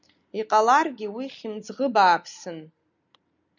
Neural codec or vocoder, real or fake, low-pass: none; real; 7.2 kHz